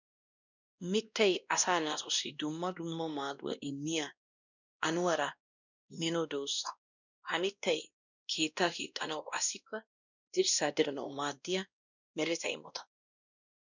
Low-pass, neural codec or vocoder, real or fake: 7.2 kHz; codec, 16 kHz, 1 kbps, X-Codec, WavLM features, trained on Multilingual LibriSpeech; fake